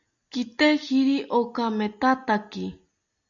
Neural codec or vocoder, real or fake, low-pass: none; real; 7.2 kHz